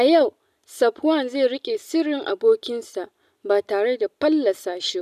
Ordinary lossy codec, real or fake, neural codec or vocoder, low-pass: none; real; none; 14.4 kHz